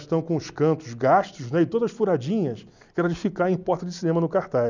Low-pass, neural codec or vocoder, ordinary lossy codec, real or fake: 7.2 kHz; none; none; real